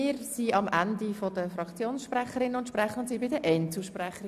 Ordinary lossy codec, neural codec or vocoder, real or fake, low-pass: AAC, 96 kbps; none; real; 14.4 kHz